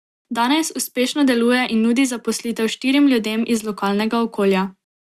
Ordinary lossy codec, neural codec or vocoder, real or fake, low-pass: Opus, 64 kbps; none; real; 14.4 kHz